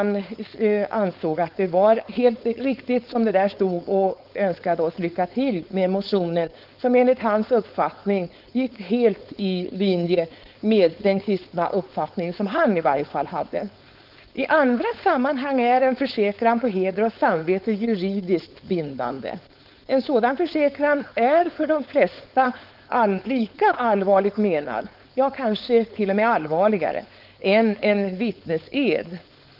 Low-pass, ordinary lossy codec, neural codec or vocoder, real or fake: 5.4 kHz; Opus, 24 kbps; codec, 16 kHz, 4.8 kbps, FACodec; fake